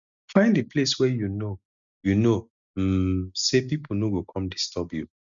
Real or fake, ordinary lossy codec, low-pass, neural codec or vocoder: real; none; 7.2 kHz; none